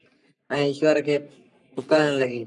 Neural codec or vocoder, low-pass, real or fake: codec, 44.1 kHz, 3.4 kbps, Pupu-Codec; 10.8 kHz; fake